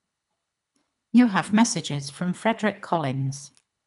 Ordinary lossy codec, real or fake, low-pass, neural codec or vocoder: none; fake; 10.8 kHz; codec, 24 kHz, 3 kbps, HILCodec